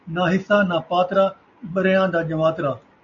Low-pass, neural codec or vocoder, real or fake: 7.2 kHz; none; real